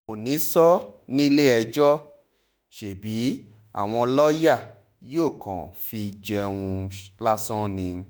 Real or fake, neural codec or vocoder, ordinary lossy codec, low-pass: fake; autoencoder, 48 kHz, 32 numbers a frame, DAC-VAE, trained on Japanese speech; none; none